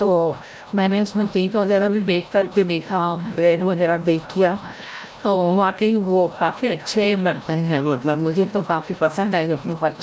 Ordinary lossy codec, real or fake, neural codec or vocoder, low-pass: none; fake; codec, 16 kHz, 0.5 kbps, FreqCodec, larger model; none